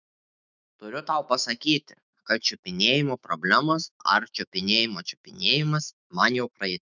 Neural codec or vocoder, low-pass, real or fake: autoencoder, 48 kHz, 128 numbers a frame, DAC-VAE, trained on Japanese speech; 7.2 kHz; fake